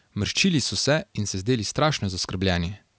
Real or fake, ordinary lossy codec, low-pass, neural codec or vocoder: real; none; none; none